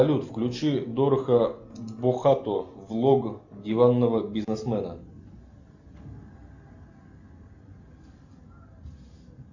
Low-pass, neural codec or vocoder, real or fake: 7.2 kHz; none; real